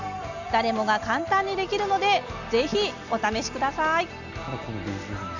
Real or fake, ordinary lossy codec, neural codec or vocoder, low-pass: real; none; none; 7.2 kHz